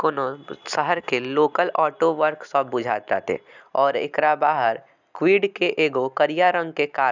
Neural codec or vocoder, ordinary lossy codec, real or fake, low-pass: none; none; real; 7.2 kHz